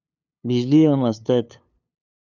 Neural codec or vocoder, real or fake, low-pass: codec, 16 kHz, 2 kbps, FunCodec, trained on LibriTTS, 25 frames a second; fake; 7.2 kHz